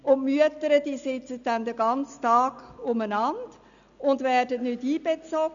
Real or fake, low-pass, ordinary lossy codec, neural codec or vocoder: real; 7.2 kHz; none; none